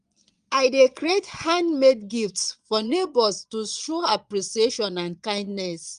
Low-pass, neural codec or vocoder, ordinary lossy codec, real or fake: 9.9 kHz; vocoder, 22.05 kHz, 80 mel bands, Vocos; Opus, 32 kbps; fake